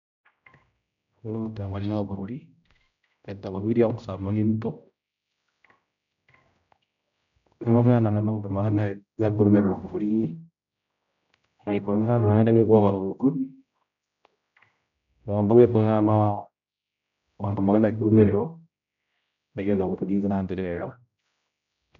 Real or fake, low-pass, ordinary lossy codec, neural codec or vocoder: fake; 7.2 kHz; none; codec, 16 kHz, 0.5 kbps, X-Codec, HuBERT features, trained on general audio